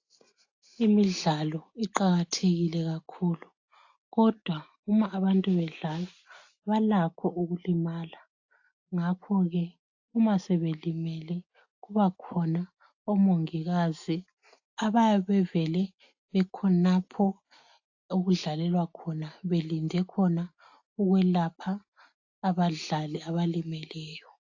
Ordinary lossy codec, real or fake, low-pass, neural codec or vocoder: AAC, 48 kbps; real; 7.2 kHz; none